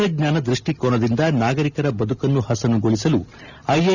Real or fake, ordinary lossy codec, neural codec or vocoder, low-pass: real; none; none; 7.2 kHz